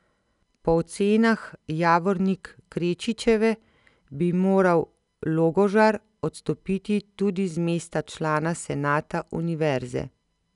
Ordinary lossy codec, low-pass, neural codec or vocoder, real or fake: none; 10.8 kHz; none; real